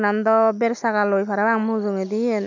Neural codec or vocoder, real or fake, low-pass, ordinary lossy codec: none; real; 7.2 kHz; none